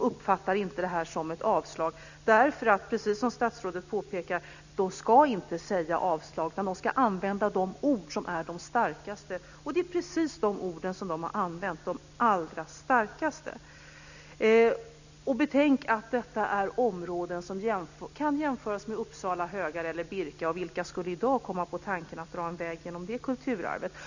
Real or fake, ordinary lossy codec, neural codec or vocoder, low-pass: real; none; none; 7.2 kHz